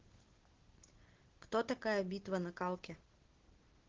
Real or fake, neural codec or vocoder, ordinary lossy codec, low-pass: real; none; Opus, 16 kbps; 7.2 kHz